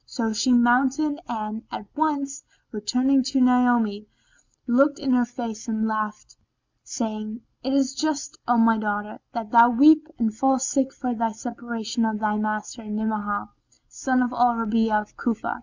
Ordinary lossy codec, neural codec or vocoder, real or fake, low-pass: MP3, 64 kbps; none; real; 7.2 kHz